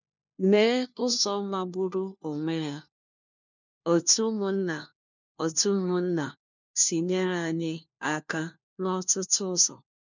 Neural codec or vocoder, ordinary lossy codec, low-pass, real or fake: codec, 16 kHz, 1 kbps, FunCodec, trained on LibriTTS, 50 frames a second; none; 7.2 kHz; fake